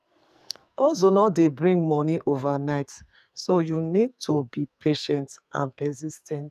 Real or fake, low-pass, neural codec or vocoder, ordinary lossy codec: fake; 14.4 kHz; codec, 44.1 kHz, 2.6 kbps, SNAC; none